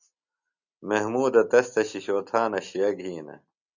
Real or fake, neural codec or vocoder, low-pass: real; none; 7.2 kHz